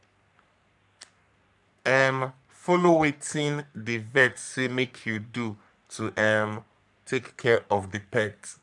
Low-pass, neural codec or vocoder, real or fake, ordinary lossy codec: 10.8 kHz; codec, 44.1 kHz, 3.4 kbps, Pupu-Codec; fake; none